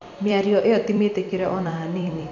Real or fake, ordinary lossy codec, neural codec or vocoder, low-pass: fake; none; vocoder, 44.1 kHz, 128 mel bands every 256 samples, BigVGAN v2; 7.2 kHz